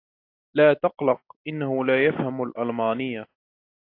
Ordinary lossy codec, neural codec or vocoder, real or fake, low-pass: AAC, 32 kbps; none; real; 5.4 kHz